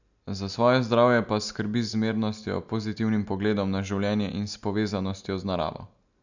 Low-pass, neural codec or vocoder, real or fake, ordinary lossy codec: 7.2 kHz; none; real; none